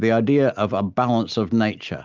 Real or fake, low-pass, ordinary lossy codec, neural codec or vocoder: real; 7.2 kHz; Opus, 24 kbps; none